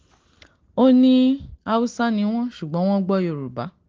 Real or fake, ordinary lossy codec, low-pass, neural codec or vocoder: real; Opus, 16 kbps; 7.2 kHz; none